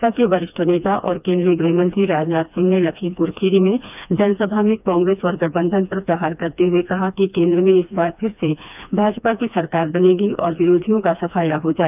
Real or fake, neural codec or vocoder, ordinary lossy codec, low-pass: fake; codec, 16 kHz, 2 kbps, FreqCodec, smaller model; none; 3.6 kHz